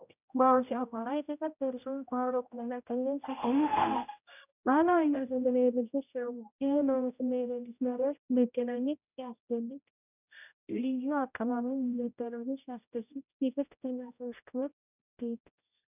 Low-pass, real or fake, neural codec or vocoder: 3.6 kHz; fake; codec, 16 kHz, 0.5 kbps, X-Codec, HuBERT features, trained on general audio